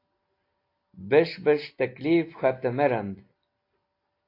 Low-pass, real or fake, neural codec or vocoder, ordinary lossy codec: 5.4 kHz; real; none; AAC, 32 kbps